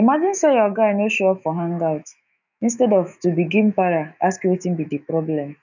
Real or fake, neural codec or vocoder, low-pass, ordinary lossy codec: fake; autoencoder, 48 kHz, 128 numbers a frame, DAC-VAE, trained on Japanese speech; 7.2 kHz; none